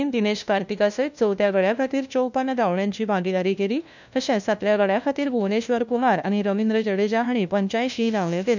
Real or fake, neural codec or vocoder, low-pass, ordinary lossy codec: fake; codec, 16 kHz, 1 kbps, FunCodec, trained on LibriTTS, 50 frames a second; 7.2 kHz; none